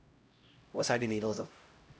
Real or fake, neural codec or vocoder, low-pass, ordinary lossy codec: fake; codec, 16 kHz, 0.5 kbps, X-Codec, HuBERT features, trained on LibriSpeech; none; none